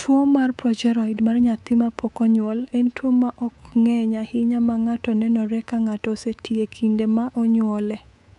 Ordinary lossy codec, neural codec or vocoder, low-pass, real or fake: none; codec, 24 kHz, 3.1 kbps, DualCodec; 10.8 kHz; fake